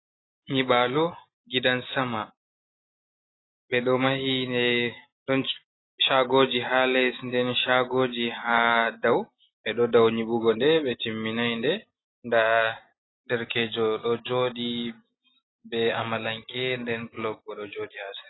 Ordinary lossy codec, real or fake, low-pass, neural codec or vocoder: AAC, 16 kbps; real; 7.2 kHz; none